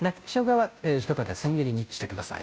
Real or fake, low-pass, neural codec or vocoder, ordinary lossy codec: fake; none; codec, 16 kHz, 0.5 kbps, FunCodec, trained on Chinese and English, 25 frames a second; none